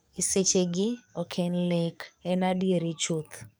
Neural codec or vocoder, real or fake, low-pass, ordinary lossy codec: codec, 44.1 kHz, 7.8 kbps, DAC; fake; none; none